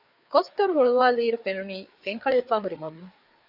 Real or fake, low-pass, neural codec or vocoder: fake; 5.4 kHz; codec, 16 kHz, 4 kbps, FreqCodec, larger model